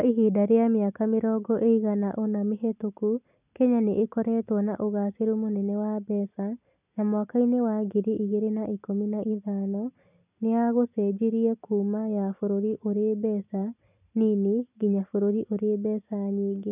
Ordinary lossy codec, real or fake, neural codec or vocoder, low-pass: none; real; none; 3.6 kHz